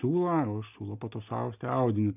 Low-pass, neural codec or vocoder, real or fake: 3.6 kHz; none; real